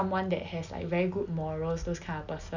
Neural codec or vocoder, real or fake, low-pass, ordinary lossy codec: none; real; 7.2 kHz; none